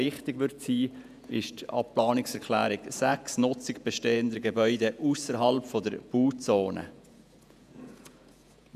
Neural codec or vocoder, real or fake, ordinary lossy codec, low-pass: vocoder, 48 kHz, 128 mel bands, Vocos; fake; none; 14.4 kHz